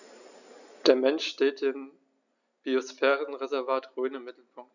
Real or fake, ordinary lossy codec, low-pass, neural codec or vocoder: real; none; 7.2 kHz; none